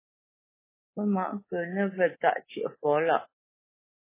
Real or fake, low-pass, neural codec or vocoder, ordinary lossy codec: fake; 3.6 kHz; codec, 24 kHz, 3.1 kbps, DualCodec; MP3, 16 kbps